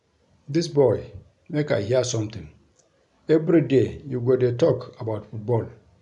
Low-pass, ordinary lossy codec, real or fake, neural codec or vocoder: 10.8 kHz; none; real; none